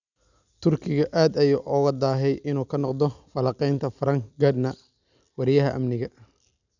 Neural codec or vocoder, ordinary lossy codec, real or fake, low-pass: none; none; real; 7.2 kHz